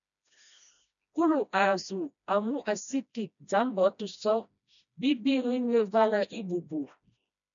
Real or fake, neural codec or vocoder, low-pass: fake; codec, 16 kHz, 1 kbps, FreqCodec, smaller model; 7.2 kHz